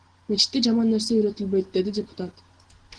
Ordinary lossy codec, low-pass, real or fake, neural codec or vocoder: Opus, 16 kbps; 9.9 kHz; real; none